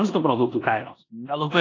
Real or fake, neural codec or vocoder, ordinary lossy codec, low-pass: fake; codec, 16 kHz in and 24 kHz out, 0.9 kbps, LongCat-Audio-Codec, four codebook decoder; AAC, 32 kbps; 7.2 kHz